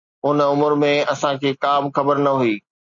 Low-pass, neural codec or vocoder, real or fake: 7.2 kHz; none; real